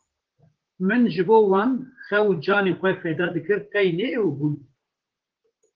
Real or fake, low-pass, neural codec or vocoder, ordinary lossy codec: fake; 7.2 kHz; vocoder, 44.1 kHz, 128 mel bands, Pupu-Vocoder; Opus, 16 kbps